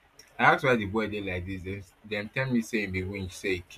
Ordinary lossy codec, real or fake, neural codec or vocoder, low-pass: AAC, 96 kbps; real; none; 14.4 kHz